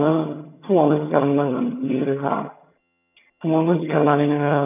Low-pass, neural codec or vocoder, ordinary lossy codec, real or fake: 3.6 kHz; vocoder, 22.05 kHz, 80 mel bands, HiFi-GAN; none; fake